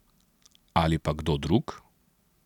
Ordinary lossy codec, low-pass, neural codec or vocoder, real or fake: none; 19.8 kHz; none; real